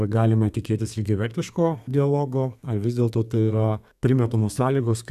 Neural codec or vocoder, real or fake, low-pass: codec, 32 kHz, 1.9 kbps, SNAC; fake; 14.4 kHz